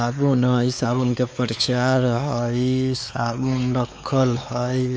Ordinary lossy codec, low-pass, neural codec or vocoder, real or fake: none; none; codec, 16 kHz, 4 kbps, X-Codec, WavLM features, trained on Multilingual LibriSpeech; fake